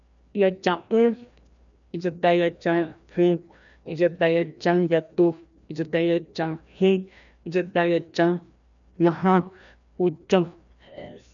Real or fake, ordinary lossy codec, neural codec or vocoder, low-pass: fake; none; codec, 16 kHz, 1 kbps, FreqCodec, larger model; 7.2 kHz